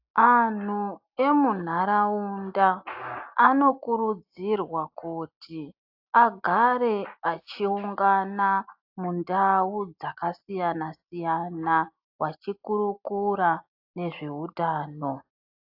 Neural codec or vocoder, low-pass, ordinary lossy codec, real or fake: none; 5.4 kHz; AAC, 48 kbps; real